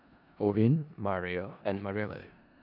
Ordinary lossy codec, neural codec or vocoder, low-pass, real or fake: none; codec, 16 kHz in and 24 kHz out, 0.4 kbps, LongCat-Audio-Codec, four codebook decoder; 5.4 kHz; fake